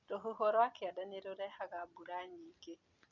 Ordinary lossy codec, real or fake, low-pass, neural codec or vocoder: none; real; 7.2 kHz; none